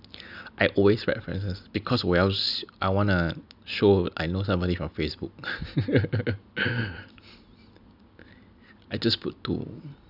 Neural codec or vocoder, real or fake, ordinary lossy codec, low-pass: none; real; none; 5.4 kHz